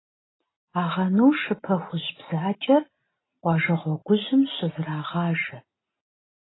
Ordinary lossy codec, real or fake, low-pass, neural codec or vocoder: AAC, 16 kbps; real; 7.2 kHz; none